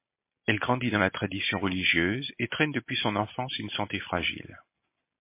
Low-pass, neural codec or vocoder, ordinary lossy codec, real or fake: 3.6 kHz; none; MP3, 24 kbps; real